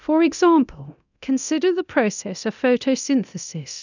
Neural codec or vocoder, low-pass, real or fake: codec, 24 kHz, 0.9 kbps, DualCodec; 7.2 kHz; fake